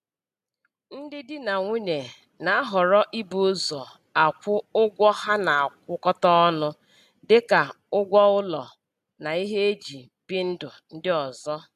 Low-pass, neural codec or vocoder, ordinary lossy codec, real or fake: 14.4 kHz; none; AAC, 96 kbps; real